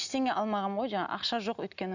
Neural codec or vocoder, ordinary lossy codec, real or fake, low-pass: none; none; real; 7.2 kHz